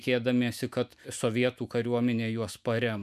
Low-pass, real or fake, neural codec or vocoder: 14.4 kHz; fake; autoencoder, 48 kHz, 128 numbers a frame, DAC-VAE, trained on Japanese speech